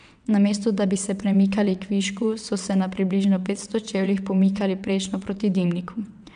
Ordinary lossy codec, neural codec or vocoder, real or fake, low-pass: none; vocoder, 22.05 kHz, 80 mel bands, WaveNeXt; fake; 9.9 kHz